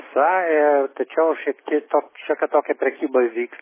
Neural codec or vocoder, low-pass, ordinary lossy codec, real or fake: none; 3.6 kHz; MP3, 16 kbps; real